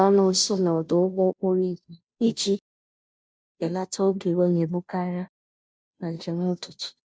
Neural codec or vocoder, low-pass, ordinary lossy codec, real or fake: codec, 16 kHz, 0.5 kbps, FunCodec, trained on Chinese and English, 25 frames a second; none; none; fake